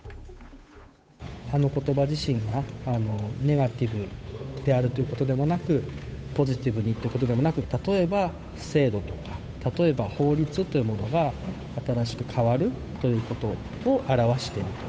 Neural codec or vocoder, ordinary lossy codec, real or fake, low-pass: codec, 16 kHz, 8 kbps, FunCodec, trained on Chinese and English, 25 frames a second; none; fake; none